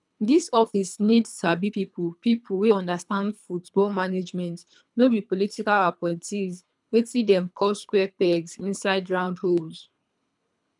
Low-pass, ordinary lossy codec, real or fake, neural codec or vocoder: 10.8 kHz; none; fake; codec, 24 kHz, 3 kbps, HILCodec